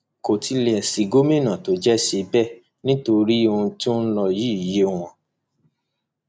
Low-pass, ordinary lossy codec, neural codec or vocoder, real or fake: none; none; none; real